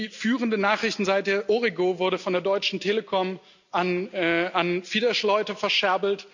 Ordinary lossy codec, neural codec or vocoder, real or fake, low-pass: none; none; real; 7.2 kHz